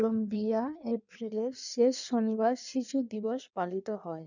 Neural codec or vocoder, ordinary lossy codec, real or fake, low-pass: codec, 16 kHz in and 24 kHz out, 1.1 kbps, FireRedTTS-2 codec; none; fake; 7.2 kHz